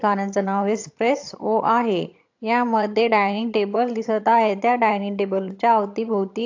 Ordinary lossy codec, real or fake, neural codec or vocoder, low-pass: AAC, 48 kbps; fake; vocoder, 22.05 kHz, 80 mel bands, HiFi-GAN; 7.2 kHz